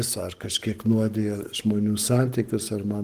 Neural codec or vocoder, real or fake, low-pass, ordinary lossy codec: codec, 44.1 kHz, 7.8 kbps, Pupu-Codec; fake; 14.4 kHz; Opus, 24 kbps